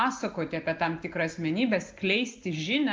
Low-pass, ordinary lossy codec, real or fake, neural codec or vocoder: 7.2 kHz; Opus, 32 kbps; real; none